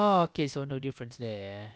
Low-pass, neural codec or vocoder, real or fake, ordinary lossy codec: none; codec, 16 kHz, about 1 kbps, DyCAST, with the encoder's durations; fake; none